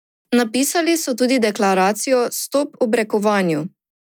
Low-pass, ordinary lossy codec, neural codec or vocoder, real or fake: none; none; none; real